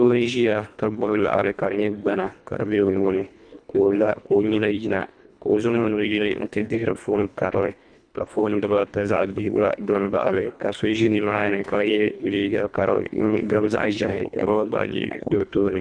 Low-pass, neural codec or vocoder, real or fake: 9.9 kHz; codec, 24 kHz, 1.5 kbps, HILCodec; fake